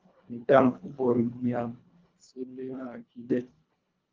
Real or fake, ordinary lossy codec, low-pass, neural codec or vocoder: fake; Opus, 32 kbps; 7.2 kHz; codec, 24 kHz, 1.5 kbps, HILCodec